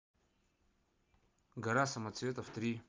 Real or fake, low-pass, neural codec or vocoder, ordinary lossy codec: real; 7.2 kHz; none; Opus, 24 kbps